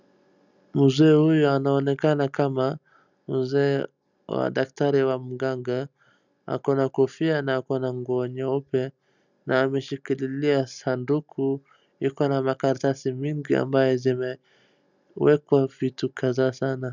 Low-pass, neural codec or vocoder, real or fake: 7.2 kHz; none; real